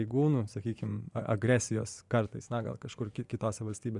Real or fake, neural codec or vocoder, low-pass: fake; vocoder, 24 kHz, 100 mel bands, Vocos; 10.8 kHz